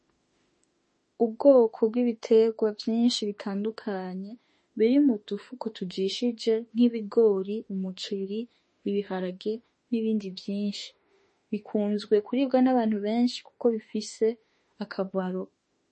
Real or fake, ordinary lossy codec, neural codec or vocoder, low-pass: fake; MP3, 32 kbps; autoencoder, 48 kHz, 32 numbers a frame, DAC-VAE, trained on Japanese speech; 10.8 kHz